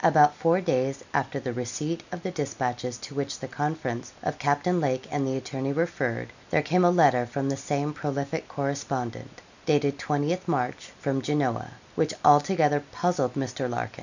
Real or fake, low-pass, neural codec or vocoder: real; 7.2 kHz; none